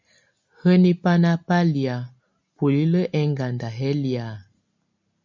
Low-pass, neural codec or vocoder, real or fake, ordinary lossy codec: 7.2 kHz; none; real; MP3, 64 kbps